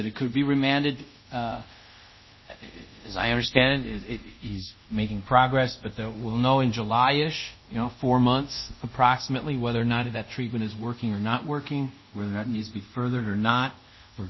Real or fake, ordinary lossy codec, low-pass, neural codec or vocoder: fake; MP3, 24 kbps; 7.2 kHz; codec, 24 kHz, 0.5 kbps, DualCodec